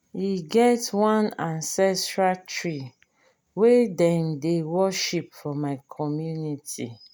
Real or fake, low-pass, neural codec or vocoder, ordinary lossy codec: real; none; none; none